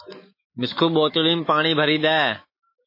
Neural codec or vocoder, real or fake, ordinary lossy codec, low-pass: autoencoder, 48 kHz, 128 numbers a frame, DAC-VAE, trained on Japanese speech; fake; MP3, 24 kbps; 5.4 kHz